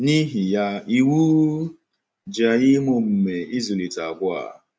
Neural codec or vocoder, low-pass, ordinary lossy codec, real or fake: none; none; none; real